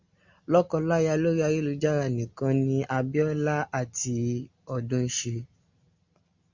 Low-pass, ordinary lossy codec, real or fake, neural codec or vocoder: 7.2 kHz; Opus, 64 kbps; real; none